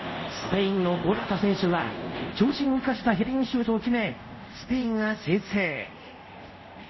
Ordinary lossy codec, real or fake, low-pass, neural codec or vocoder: MP3, 24 kbps; fake; 7.2 kHz; codec, 24 kHz, 0.5 kbps, DualCodec